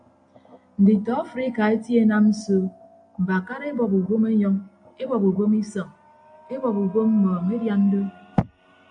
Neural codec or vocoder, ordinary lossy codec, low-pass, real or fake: none; AAC, 64 kbps; 9.9 kHz; real